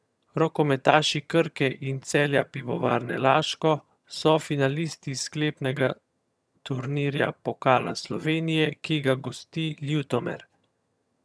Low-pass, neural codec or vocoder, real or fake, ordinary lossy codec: none; vocoder, 22.05 kHz, 80 mel bands, HiFi-GAN; fake; none